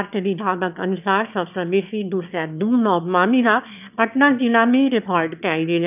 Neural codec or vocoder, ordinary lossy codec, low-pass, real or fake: autoencoder, 22.05 kHz, a latent of 192 numbers a frame, VITS, trained on one speaker; none; 3.6 kHz; fake